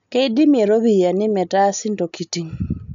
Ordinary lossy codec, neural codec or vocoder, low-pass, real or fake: MP3, 96 kbps; none; 7.2 kHz; real